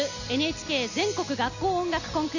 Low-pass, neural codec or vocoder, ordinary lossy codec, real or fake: 7.2 kHz; none; none; real